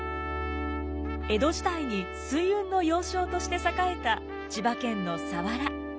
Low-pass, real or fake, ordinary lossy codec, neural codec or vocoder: none; real; none; none